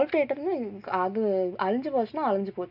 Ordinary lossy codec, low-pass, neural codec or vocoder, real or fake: none; 5.4 kHz; none; real